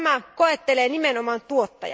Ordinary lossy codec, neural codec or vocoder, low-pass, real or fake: none; none; none; real